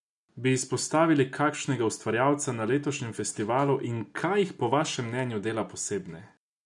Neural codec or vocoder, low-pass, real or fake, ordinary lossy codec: none; 10.8 kHz; real; none